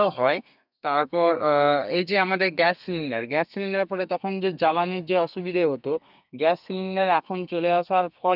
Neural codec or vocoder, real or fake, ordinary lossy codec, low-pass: codec, 32 kHz, 1.9 kbps, SNAC; fake; none; 5.4 kHz